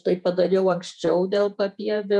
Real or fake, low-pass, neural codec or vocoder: fake; 10.8 kHz; vocoder, 48 kHz, 128 mel bands, Vocos